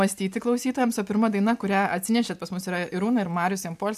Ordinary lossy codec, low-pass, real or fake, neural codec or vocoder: AAC, 96 kbps; 14.4 kHz; real; none